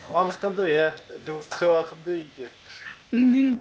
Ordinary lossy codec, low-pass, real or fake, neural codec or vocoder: none; none; fake; codec, 16 kHz, 0.8 kbps, ZipCodec